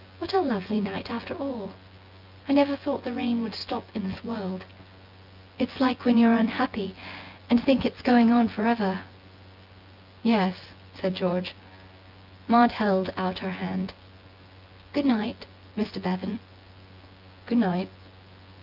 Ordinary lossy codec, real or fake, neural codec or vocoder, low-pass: Opus, 32 kbps; fake; vocoder, 24 kHz, 100 mel bands, Vocos; 5.4 kHz